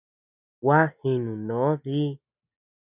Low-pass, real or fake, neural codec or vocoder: 3.6 kHz; real; none